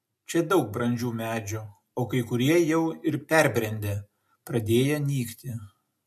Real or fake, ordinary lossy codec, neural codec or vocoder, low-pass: real; MP3, 64 kbps; none; 14.4 kHz